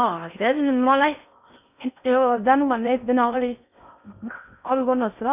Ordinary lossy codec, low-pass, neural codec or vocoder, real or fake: none; 3.6 kHz; codec, 16 kHz in and 24 kHz out, 0.6 kbps, FocalCodec, streaming, 4096 codes; fake